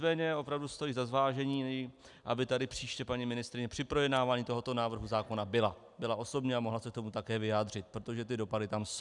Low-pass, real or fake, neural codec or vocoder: 10.8 kHz; real; none